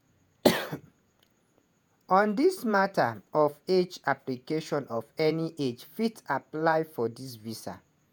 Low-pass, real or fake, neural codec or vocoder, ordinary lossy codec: none; fake; vocoder, 48 kHz, 128 mel bands, Vocos; none